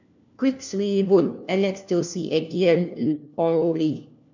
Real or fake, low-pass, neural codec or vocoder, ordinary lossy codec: fake; 7.2 kHz; codec, 16 kHz, 1 kbps, FunCodec, trained on LibriTTS, 50 frames a second; none